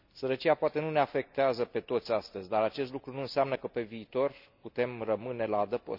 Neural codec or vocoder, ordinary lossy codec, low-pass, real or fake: none; none; 5.4 kHz; real